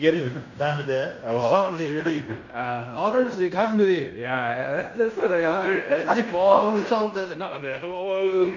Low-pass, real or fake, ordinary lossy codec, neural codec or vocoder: 7.2 kHz; fake; none; codec, 16 kHz in and 24 kHz out, 0.9 kbps, LongCat-Audio-Codec, fine tuned four codebook decoder